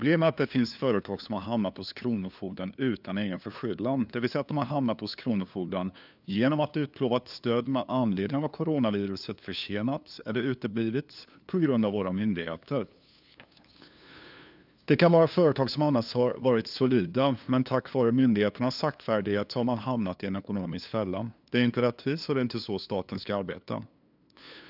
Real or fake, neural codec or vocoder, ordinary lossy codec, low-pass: fake; codec, 16 kHz, 2 kbps, FunCodec, trained on LibriTTS, 25 frames a second; none; 5.4 kHz